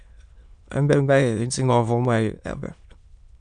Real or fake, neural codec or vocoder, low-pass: fake; autoencoder, 22.05 kHz, a latent of 192 numbers a frame, VITS, trained on many speakers; 9.9 kHz